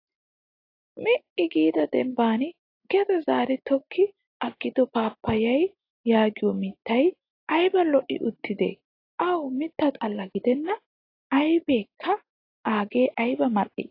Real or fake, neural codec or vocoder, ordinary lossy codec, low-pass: real; none; AAC, 32 kbps; 5.4 kHz